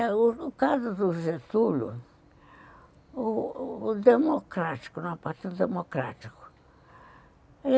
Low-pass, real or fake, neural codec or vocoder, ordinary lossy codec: none; real; none; none